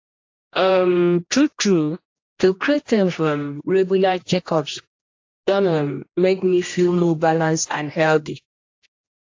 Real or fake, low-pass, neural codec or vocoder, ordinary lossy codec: fake; 7.2 kHz; codec, 16 kHz, 1 kbps, X-Codec, HuBERT features, trained on general audio; AAC, 48 kbps